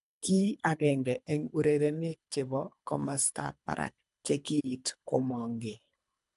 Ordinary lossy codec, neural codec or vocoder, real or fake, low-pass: none; codec, 24 kHz, 3 kbps, HILCodec; fake; 10.8 kHz